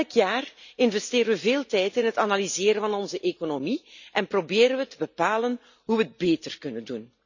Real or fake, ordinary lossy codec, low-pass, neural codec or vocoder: real; none; 7.2 kHz; none